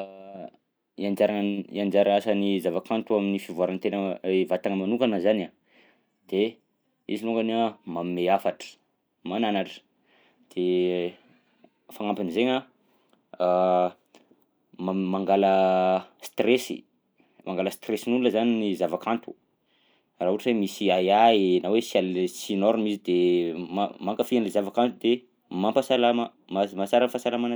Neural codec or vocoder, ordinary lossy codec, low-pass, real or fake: none; none; none; real